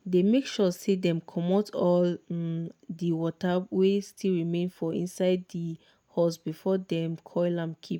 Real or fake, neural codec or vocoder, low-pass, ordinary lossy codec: real; none; 19.8 kHz; none